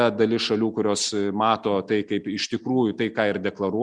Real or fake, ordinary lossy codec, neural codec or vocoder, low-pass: real; Opus, 64 kbps; none; 9.9 kHz